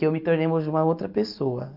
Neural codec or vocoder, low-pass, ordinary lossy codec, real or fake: none; 5.4 kHz; none; real